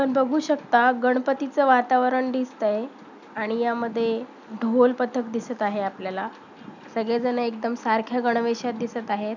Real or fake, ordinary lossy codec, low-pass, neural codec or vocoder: real; none; 7.2 kHz; none